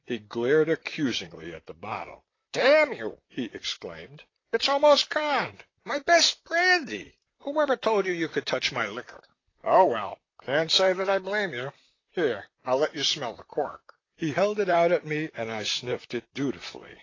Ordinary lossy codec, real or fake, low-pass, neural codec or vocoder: AAC, 32 kbps; fake; 7.2 kHz; vocoder, 44.1 kHz, 128 mel bands, Pupu-Vocoder